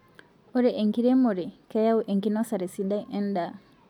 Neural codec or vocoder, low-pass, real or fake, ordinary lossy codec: none; 19.8 kHz; real; none